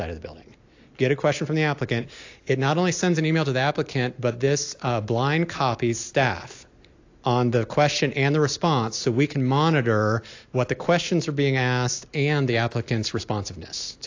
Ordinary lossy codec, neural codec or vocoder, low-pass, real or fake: AAC, 48 kbps; none; 7.2 kHz; real